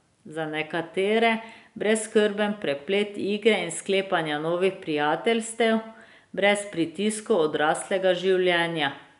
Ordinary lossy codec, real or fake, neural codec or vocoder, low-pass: none; real; none; 10.8 kHz